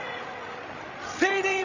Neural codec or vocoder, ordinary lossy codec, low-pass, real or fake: codec, 16 kHz, 16 kbps, FreqCodec, larger model; none; 7.2 kHz; fake